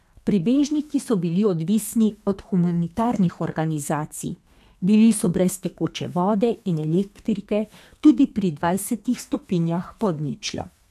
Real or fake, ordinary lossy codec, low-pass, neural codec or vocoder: fake; none; 14.4 kHz; codec, 32 kHz, 1.9 kbps, SNAC